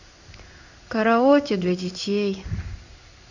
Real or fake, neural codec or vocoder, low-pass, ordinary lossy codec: fake; codec, 16 kHz in and 24 kHz out, 1 kbps, XY-Tokenizer; 7.2 kHz; none